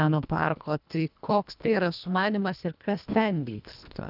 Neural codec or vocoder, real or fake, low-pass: codec, 24 kHz, 1.5 kbps, HILCodec; fake; 5.4 kHz